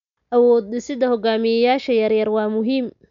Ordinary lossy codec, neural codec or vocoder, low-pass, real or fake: none; none; 7.2 kHz; real